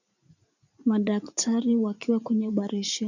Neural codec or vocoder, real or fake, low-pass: vocoder, 44.1 kHz, 80 mel bands, Vocos; fake; 7.2 kHz